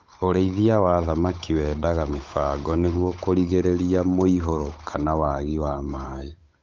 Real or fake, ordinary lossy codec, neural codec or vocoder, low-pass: fake; Opus, 24 kbps; codec, 16 kHz, 8 kbps, FunCodec, trained on Chinese and English, 25 frames a second; 7.2 kHz